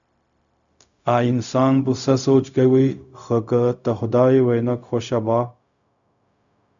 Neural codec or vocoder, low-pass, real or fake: codec, 16 kHz, 0.4 kbps, LongCat-Audio-Codec; 7.2 kHz; fake